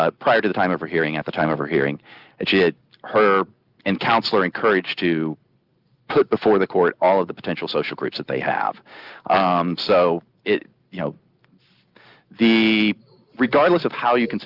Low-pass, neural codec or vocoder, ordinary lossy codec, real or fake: 5.4 kHz; none; Opus, 32 kbps; real